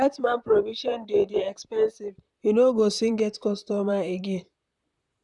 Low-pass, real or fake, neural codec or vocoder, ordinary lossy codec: 10.8 kHz; fake; vocoder, 44.1 kHz, 128 mel bands, Pupu-Vocoder; none